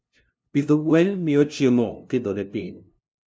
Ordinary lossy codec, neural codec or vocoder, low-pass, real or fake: none; codec, 16 kHz, 0.5 kbps, FunCodec, trained on LibriTTS, 25 frames a second; none; fake